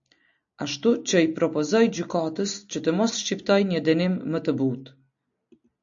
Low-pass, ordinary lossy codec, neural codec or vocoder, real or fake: 7.2 kHz; AAC, 64 kbps; none; real